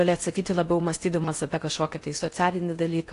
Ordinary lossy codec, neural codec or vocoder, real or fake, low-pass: AAC, 48 kbps; codec, 16 kHz in and 24 kHz out, 0.6 kbps, FocalCodec, streaming, 4096 codes; fake; 10.8 kHz